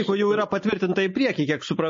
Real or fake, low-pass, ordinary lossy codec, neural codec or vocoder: real; 7.2 kHz; MP3, 32 kbps; none